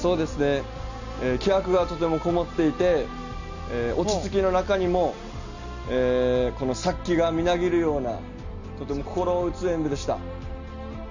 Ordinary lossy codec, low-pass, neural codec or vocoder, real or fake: none; 7.2 kHz; none; real